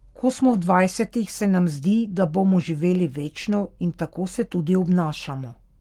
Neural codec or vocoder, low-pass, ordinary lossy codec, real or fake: codec, 44.1 kHz, 7.8 kbps, Pupu-Codec; 19.8 kHz; Opus, 24 kbps; fake